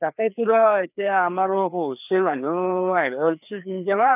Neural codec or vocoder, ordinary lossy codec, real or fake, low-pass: codec, 16 kHz, 2 kbps, FreqCodec, larger model; none; fake; 3.6 kHz